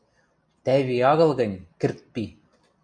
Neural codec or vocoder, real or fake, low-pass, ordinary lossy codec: none; real; 9.9 kHz; MP3, 64 kbps